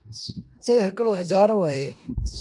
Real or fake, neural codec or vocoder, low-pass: fake; codec, 16 kHz in and 24 kHz out, 0.9 kbps, LongCat-Audio-Codec, four codebook decoder; 10.8 kHz